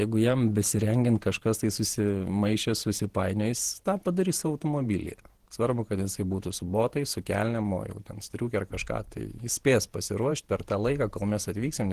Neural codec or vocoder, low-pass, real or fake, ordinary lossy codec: vocoder, 48 kHz, 128 mel bands, Vocos; 14.4 kHz; fake; Opus, 16 kbps